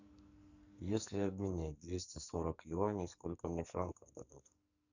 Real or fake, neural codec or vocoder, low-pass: fake; codec, 44.1 kHz, 2.6 kbps, SNAC; 7.2 kHz